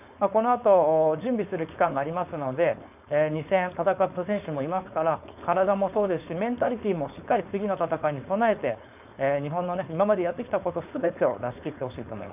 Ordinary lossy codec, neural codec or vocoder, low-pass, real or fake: none; codec, 16 kHz, 4.8 kbps, FACodec; 3.6 kHz; fake